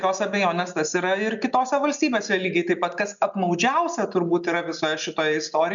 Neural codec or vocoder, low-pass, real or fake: none; 7.2 kHz; real